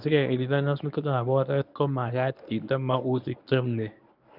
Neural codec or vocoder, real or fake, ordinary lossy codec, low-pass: codec, 24 kHz, 0.9 kbps, WavTokenizer, medium speech release version 1; fake; none; 5.4 kHz